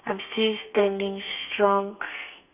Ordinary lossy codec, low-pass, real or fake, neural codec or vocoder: none; 3.6 kHz; fake; codec, 32 kHz, 1.9 kbps, SNAC